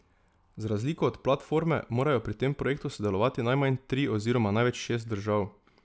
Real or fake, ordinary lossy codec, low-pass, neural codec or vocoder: real; none; none; none